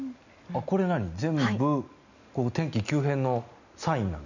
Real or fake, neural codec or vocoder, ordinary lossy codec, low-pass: real; none; none; 7.2 kHz